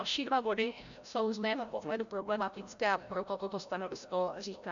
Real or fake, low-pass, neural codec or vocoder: fake; 7.2 kHz; codec, 16 kHz, 0.5 kbps, FreqCodec, larger model